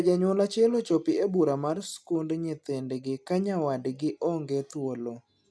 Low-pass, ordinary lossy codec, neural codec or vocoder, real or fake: none; none; none; real